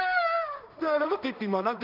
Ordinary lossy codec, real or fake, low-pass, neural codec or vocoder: none; fake; 5.4 kHz; codec, 16 kHz in and 24 kHz out, 0.4 kbps, LongCat-Audio-Codec, two codebook decoder